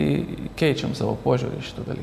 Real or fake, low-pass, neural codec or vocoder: real; 14.4 kHz; none